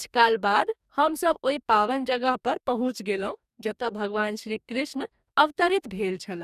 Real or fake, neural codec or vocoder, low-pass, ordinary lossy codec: fake; codec, 44.1 kHz, 2.6 kbps, DAC; 14.4 kHz; none